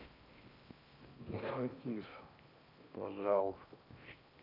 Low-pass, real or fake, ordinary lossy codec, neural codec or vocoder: 5.4 kHz; fake; none; codec, 16 kHz in and 24 kHz out, 0.6 kbps, FocalCodec, streaming, 4096 codes